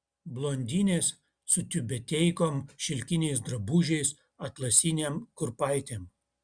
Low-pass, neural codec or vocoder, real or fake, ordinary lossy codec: 9.9 kHz; none; real; Opus, 64 kbps